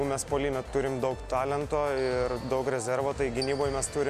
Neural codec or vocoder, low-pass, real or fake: none; 14.4 kHz; real